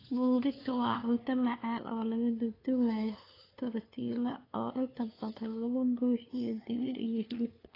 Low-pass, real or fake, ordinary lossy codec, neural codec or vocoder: 5.4 kHz; fake; AAC, 24 kbps; codec, 16 kHz, 2 kbps, FunCodec, trained on LibriTTS, 25 frames a second